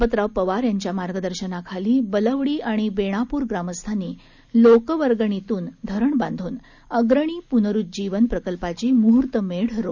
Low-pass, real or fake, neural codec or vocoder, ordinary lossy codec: 7.2 kHz; real; none; none